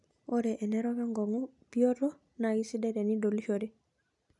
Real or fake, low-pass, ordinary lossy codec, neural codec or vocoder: real; 10.8 kHz; none; none